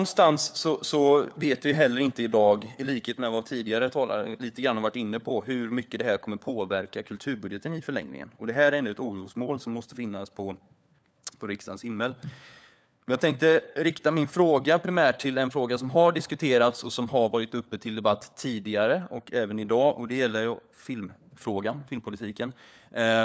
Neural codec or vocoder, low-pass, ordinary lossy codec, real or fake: codec, 16 kHz, 4 kbps, FunCodec, trained on LibriTTS, 50 frames a second; none; none; fake